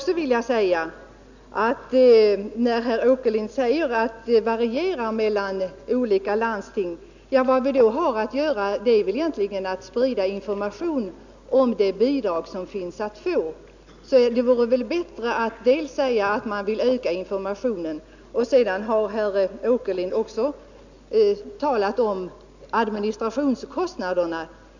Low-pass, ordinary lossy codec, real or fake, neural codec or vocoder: 7.2 kHz; none; real; none